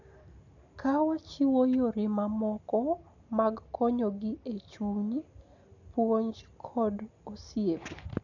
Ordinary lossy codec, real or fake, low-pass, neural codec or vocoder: none; real; 7.2 kHz; none